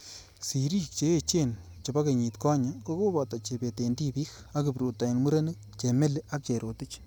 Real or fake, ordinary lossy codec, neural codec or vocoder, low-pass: real; none; none; none